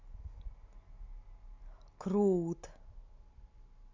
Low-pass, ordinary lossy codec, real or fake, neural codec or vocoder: 7.2 kHz; MP3, 64 kbps; real; none